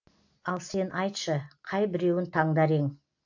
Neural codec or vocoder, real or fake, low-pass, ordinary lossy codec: none; real; 7.2 kHz; AAC, 48 kbps